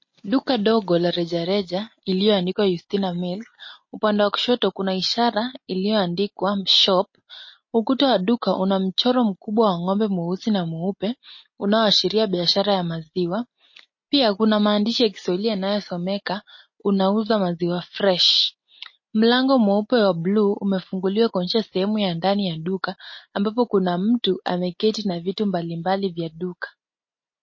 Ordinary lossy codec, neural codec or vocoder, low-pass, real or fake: MP3, 32 kbps; none; 7.2 kHz; real